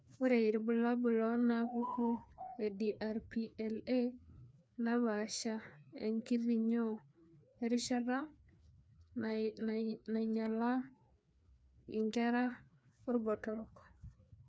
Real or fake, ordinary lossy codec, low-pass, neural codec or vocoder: fake; none; none; codec, 16 kHz, 2 kbps, FreqCodec, larger model